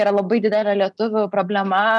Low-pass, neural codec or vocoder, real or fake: 10.8 kHz; none; real